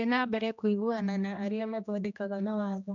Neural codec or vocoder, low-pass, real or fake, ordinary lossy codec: codec, 16 kHz, 1 kbps, X-Codec, HuBERT features, trained on general audio; 7.2 kHz; fake; none